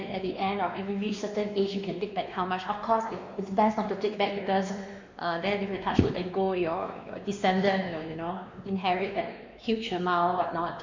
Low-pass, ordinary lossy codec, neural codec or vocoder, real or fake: 7.2 kHz; MP3, 64 kbps; codec, 16 kHz, 2 kbps, X-Codec, WavLM features, trained on Multilingual LibriSpeech; fake